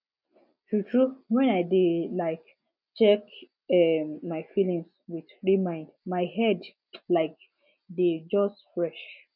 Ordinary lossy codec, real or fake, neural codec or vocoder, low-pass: none; real; none; 5.4 kHz